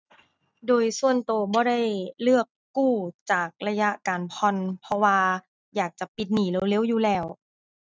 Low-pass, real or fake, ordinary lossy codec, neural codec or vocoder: none; real; none; none